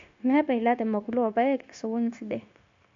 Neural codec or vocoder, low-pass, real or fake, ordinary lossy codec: codec, 16 kHz, 0.9 kbps, LongCat-Audio-Codec; 7.2 kHz; fake; AAC, 48 kbps